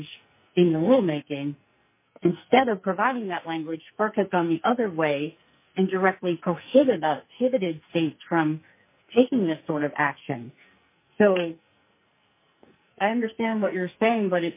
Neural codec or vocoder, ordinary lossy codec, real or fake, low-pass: codec, 44.1 kHz, 2.6 kbps, SNAC; MP3, 24 kbps; fake; 3.6 kHz